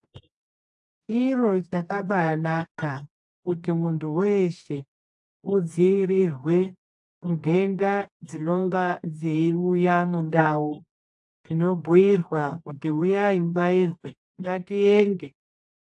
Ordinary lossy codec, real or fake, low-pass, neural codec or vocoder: AAC, 64 kbps; fake; 10.8 kHz; codec, 24 kHz, 0.9 kbps, WavTokenizer, medium music audio release